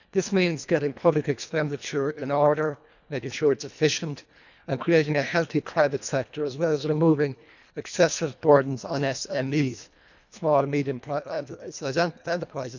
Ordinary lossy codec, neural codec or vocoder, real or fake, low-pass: none; codec, 24 kHz, 1.5 kbps, HILCodec; fake; 7.2 kHz